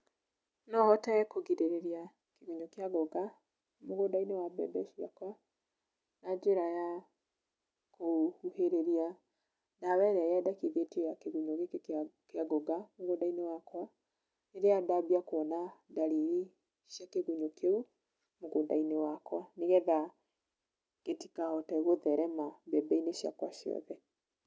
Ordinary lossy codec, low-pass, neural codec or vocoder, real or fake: none; none; none; real